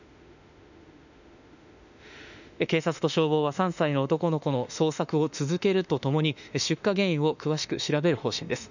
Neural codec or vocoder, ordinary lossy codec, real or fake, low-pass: autoencoder, 48 kHz, 32 numbers a frame, DAC-VAE, trained on Japanese speech; none; fake; 7.2 kHz